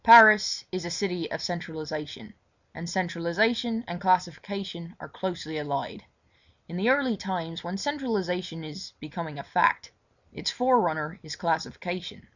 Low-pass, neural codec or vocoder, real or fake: 7.2 kHz; none; real